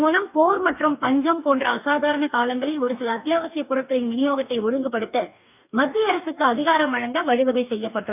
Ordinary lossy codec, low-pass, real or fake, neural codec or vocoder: none; 3.6 kHz; fake; codec, 44.1 kHz, 2.6 kbps, DAC